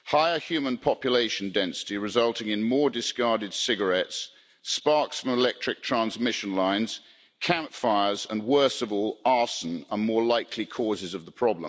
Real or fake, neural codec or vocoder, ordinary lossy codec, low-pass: real; none; none; none